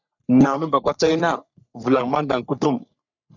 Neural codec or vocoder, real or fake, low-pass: codec, 44.1 kHz, 3.4 kbps, Pupu-Codec; fake; 7.2 kHz